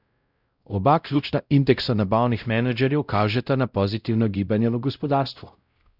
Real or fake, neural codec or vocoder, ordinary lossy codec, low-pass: fake; codec, 16 kHz, 0.5 kbps, X-Codec, WavLM features, trained on Multilingual LibriSpeech; Opus, 64 kbps; 5.4 kHz